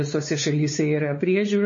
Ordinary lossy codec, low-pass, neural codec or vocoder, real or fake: MP3, 32 kbps; 7.2 kHz; codec, 16 kHz, 4 kbps, X-Codec, WavLM features, trained on Multilingual LibriSpeech; fake